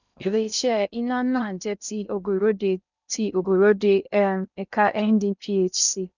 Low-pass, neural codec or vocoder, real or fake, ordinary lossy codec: 7.2 kHz; codec, 16 kHz in and 24 kHz out, 0.6 kbps, FocalCodec, streaming, 2048 codes; fake; none